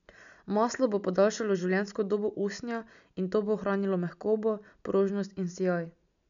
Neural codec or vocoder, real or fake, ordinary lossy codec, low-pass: none; real; none; 7.2 kHz